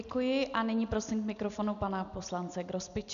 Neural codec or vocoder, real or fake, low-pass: none; real; 7.2 kHz